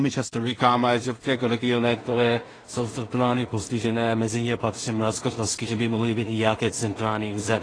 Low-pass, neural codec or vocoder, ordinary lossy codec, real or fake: 9.9 kHz; codec, 16 kHz in and 24 kHz out, 0.4 kbps, LongCat-Audio-Codec, two codebook decoder; AAC, 32 kbps; fake